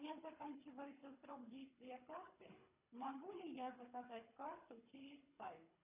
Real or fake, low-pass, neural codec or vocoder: fake; 3.6 kHz; codec, 24 kHz, 3 kbps, HILCodec